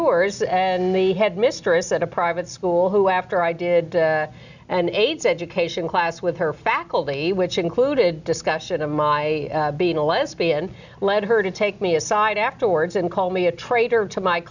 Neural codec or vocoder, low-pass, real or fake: none; 7.2 kHz; real